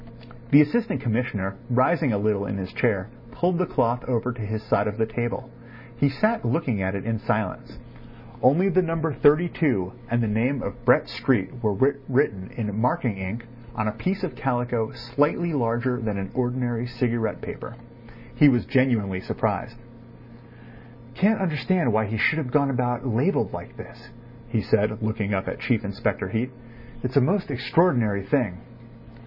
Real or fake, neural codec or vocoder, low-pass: real; none; 5.4 kHz